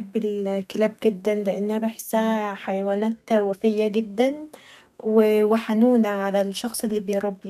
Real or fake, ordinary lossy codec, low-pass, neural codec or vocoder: fake; none; 14.4 kHz; codec, 32 kHz, 1.9 kbps, SNAC